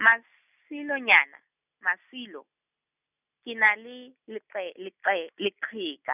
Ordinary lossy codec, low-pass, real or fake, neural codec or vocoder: none; 3.6 kHz; real; none